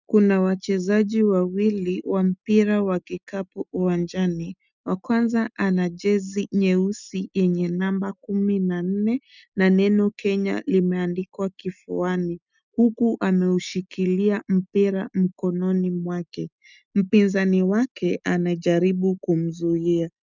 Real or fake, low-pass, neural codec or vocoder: real; 7.2 kHz; none